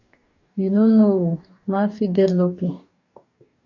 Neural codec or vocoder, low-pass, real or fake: codec, 44.1 kHz, 2.6 kbps, DAC; 7.2 kHz; fake